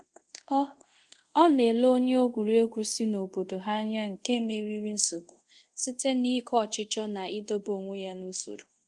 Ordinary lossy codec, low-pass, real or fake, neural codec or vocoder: Opus, 24 kbps; 10.8 kHz; fake; codec, 24 kHz, 0.5 kbps, DualCodec